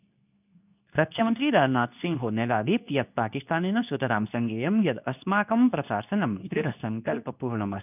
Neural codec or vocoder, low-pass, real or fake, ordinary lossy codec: codec, 24 kHz, 0.9 kbps, WavTokenizer, medium speech release version 2; 3.6 kHz; fake; none